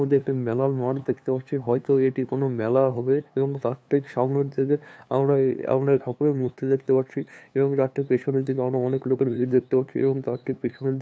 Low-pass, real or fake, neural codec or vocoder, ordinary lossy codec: none; fake; codec, 16 kHz, 2 kbps, FunCodec, trained on LibriTTS, 25 frames a second; none